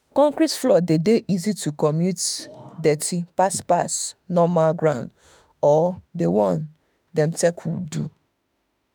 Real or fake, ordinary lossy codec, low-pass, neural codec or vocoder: fake; none; none; autoencoder, 48 kHz, 32 numbers a frame, DAC-VAE, trained on Japanese speech